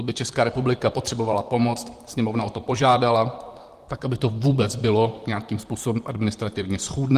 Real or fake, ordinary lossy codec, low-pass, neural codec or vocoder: fake; Opus, 24 kbps; 14.4 kHz; vocoder, 44.1 kHz, 128 mel bands, Pupu-Vocoder